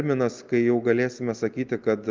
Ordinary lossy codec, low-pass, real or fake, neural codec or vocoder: Opus, 24 kbps; 7.2 kHz; real; none